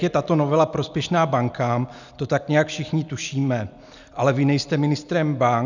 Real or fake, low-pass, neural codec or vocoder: real; 7.2 kHz; none